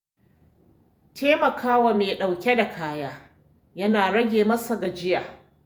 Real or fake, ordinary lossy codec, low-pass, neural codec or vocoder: fake; none; none; vocoder, 48 kHz, 128 mel bands, Vocos